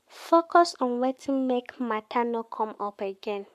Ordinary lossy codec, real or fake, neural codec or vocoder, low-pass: MP3, 96 kbps; fake; codec, 44.1 kHz, 7.8 kbps, Pupu-Codec; 14.4 kHz